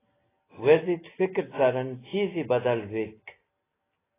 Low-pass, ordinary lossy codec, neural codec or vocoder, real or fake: 3.6 kHz; AAC, 16 kbps; none; real